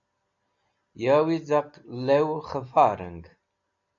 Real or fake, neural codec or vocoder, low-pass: real; none; 7.2 kHz